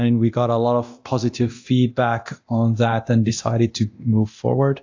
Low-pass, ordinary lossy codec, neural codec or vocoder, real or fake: 7.2 kHz; AAC, 48 kbps; codec, 24 kHz, 0.9 kbps, DualCodec; fake